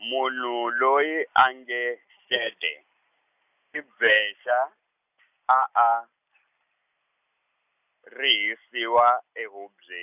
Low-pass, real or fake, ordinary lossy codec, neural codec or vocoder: 3.6 kHz; real; none; none